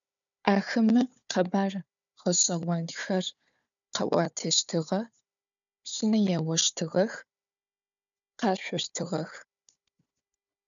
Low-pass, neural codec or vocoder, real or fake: 7.2 kHz; codec, 16 kHz, 4 kbps, FunCodec, trained on Chinese and English, 50 frames a second; fake